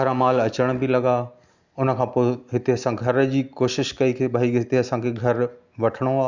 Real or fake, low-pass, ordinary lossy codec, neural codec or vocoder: real; 7.2 kHz; Opus, 64 kbps; none